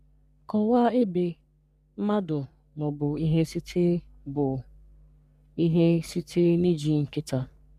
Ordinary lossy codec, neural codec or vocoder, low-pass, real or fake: none; codec, 44.1 kHz, 3.4 kbps, Pupu-Codec; 14.4 kHz; fake